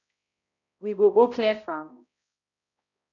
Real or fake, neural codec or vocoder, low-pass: fake; codec, 16 kHz, 0.5 kbps, X-Codec, HuBERT features, trained on balanced general audio; 7.2 kHz